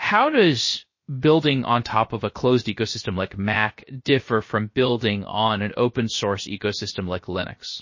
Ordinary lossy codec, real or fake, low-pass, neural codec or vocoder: MP3, 32 kbps; fake; 7.2 kHz; codec, 16 kHz, 0.7 kbps, FocalCodec